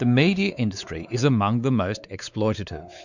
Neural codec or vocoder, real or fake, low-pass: codec, 16 kHz, 4 kbps, X-Codec, WavLM features, trained on Multilingual LibriSpeech; fake; 7.2 kHz